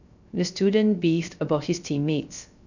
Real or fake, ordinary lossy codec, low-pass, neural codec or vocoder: fake; none; 7.2 kHz; codec, 16 kHz, 0.3 kbps, FocalCodec